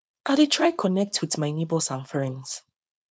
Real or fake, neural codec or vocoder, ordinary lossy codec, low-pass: fake; codec, 16 kHz, 4.8 kbps, FACodec; none; none